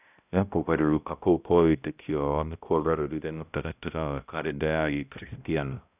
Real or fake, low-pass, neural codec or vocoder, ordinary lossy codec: fake; 3.6 kHz; codec, 16 kHz, 0.5 kbps, X-Codec, HuBERT features, trained on balanced general audio; none